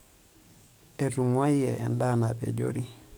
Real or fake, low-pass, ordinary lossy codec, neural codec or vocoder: fake; none; none; codec, 44.1 kHz, 7.8 kbps, DAC